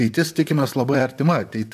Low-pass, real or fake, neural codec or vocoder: 14.4 kHz; fake; vocoder, 44.1 kHz, 128 mel bands, Pupu-Vocoder